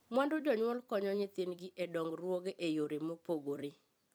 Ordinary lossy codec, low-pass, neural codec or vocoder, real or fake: none; none; vocoder, 44.1 kHz, 128 mel bands every 256 samples, BigVGAN v2; fake